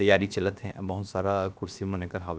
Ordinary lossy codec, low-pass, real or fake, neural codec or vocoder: none; none; fake; codec, 16 kHz, about 1 kbps, DyCAST, with the encoder's durations